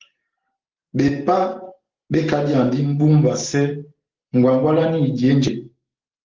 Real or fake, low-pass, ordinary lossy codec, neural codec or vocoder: real; 7.2 kHz; Opus, 16 kbps; none